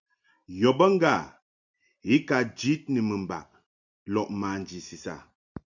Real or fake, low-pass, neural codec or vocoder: real; 7.2 kHz; none